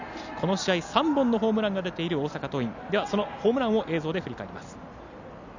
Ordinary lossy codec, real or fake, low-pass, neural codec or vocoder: none; real; 7.2 kHz; none